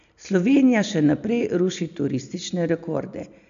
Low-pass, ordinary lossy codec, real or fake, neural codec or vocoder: 7.2 kHz; AAC, 96 kbps; real; none